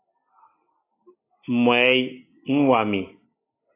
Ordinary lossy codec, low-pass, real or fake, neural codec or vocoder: AAC, 24 kbps; 3.6 kHz; real; none